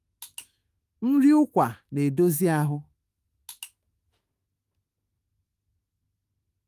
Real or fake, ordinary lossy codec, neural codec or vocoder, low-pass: fake; Opus, 32 kbps; autoencoder, 48 kHz, 128 numbers a frame, DAC-VAE, trained on Japanese speech; 14.4 kHz